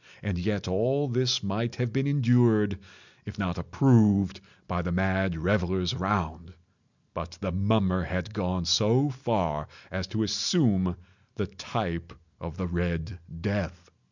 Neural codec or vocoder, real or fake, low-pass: none; real; 7.2 kHz